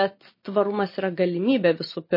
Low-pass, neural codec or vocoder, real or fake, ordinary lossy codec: 5.4 kHz; none; real; MP3, 24 kbps